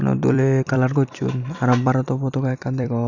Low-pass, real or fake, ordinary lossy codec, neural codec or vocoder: 7.2 kHz; fake; none; vocoder, 44.1 kHz, 128 mel bands every 256 samples, BigVGAN v2